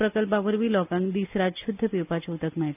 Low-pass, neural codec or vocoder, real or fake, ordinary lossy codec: 3.6 kHz; none; real; none